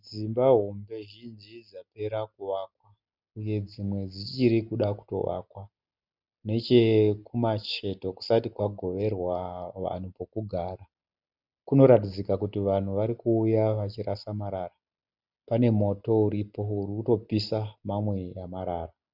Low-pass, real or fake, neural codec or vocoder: 5.4 kHz; real; none